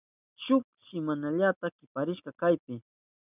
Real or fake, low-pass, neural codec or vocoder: real; 3.6 kHz; none